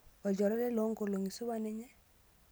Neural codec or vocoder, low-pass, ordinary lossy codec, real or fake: none; none; none; real